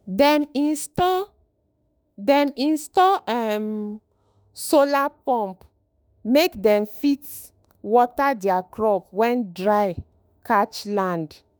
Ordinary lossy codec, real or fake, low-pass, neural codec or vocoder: none; fake; none; autoencoder, 48 kHz, 32 numbers a frame, DAC-VAE, trained on Japanese speech